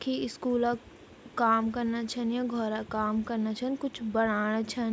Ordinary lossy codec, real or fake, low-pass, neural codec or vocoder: none; real; none; none